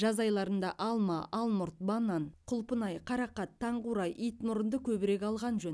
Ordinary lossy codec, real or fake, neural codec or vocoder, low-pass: none; real; none; none